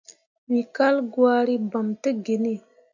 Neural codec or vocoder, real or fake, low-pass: none; real; 7.2 kHz